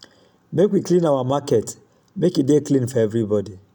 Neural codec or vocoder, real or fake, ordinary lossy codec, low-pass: none; real; none; 19.8 kHz